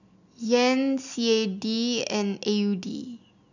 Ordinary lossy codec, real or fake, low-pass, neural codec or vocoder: none; real; 7.2 kHz; none